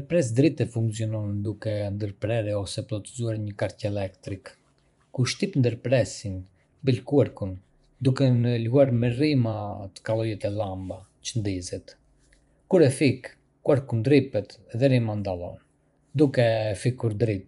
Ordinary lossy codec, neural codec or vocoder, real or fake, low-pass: none; vocoder, 24 kHz, 100 mel bands, Vocos; fake; 10.8 kHz